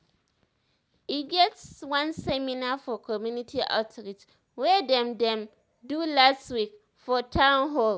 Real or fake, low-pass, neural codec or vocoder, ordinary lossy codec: real; none; none; none